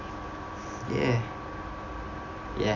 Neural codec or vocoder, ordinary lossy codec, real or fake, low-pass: none; AAC, 48 kbps; real; 7.2 kHz